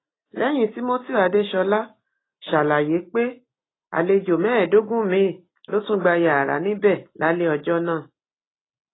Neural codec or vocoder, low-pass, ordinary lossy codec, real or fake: none; 7.2 kHz; AAC, 16 kbps; real